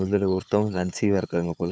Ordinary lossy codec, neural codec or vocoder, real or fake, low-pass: none; codec, 16 kHz, 4 kbps, FunCodec, trained on Chinese and English, 50 frames a second; fake; none